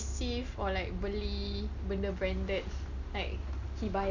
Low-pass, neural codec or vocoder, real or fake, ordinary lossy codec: 7.2 kHz; none; real; none